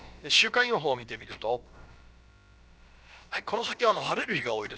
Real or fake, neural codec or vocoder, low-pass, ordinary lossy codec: fake; codec, 16 kHz, about 1 kbps, DyCAST, with the encoder's durations; none; none